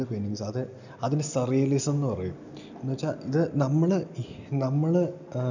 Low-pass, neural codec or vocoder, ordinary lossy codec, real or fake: 7.2 kHz; none; none; real